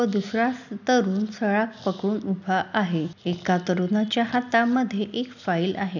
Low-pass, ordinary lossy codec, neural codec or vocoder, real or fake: 7.2 kHz; none; none; real